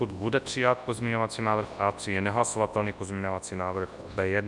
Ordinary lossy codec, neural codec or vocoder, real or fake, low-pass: Opus, 64 kbps; codec, 24 kHz, 0.9 kbps, WavTokenizer, large speech release; fake; 10.8 kHz